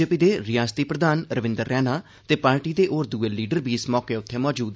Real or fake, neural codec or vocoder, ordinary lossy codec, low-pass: real; none; none; none